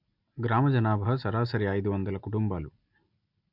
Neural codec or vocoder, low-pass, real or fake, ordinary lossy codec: none; 5.4 kHz; real; MP3, 48 kbps